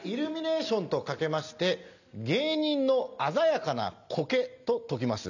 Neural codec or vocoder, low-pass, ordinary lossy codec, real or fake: none; 7.2 kHz; none; real